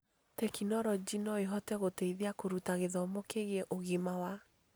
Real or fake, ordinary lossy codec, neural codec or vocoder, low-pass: real; none; none; none